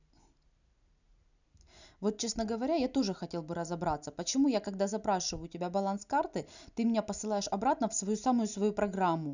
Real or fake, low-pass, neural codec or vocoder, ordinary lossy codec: real; 7.2 kHz; none; none